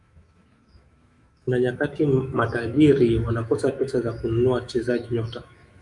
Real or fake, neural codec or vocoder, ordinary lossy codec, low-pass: fake; autoencoder, 48 kHz, 128 numbers a frame, DAC-VAE, trained on Japanese speech; Opus, 64 kbps; 10.8 kHz